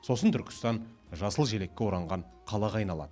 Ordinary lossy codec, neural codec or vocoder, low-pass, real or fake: none; none; none; real